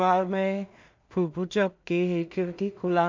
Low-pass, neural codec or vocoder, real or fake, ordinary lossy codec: 7.2 kHz; codec, 16 kHz in and 24 kHz out, 0.4 kbps, LongCat-Audio-Codec, two codebook decoder; fake; MP3, 64 kbps